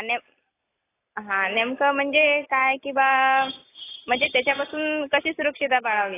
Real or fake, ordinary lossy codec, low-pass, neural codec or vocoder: real; AAC, 16 kbps; 3.6 kHz; none